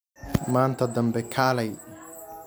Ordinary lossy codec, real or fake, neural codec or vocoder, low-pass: none; real; none; none